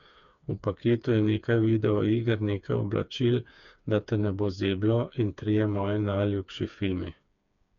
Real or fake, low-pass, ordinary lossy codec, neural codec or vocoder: fake; 7.2 kHz; none; codec, 16 kHz, 4 kbps, FreqCodec, smaller model